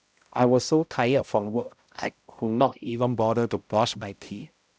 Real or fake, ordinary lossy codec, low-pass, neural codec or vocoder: fake; none; none; codec, 16 kHz, 0.5 kbps, X-Codec, HuBERT features, trained on balanced general audio